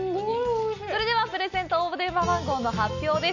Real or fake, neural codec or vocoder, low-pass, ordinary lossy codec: real; none; 7.2 kHz; none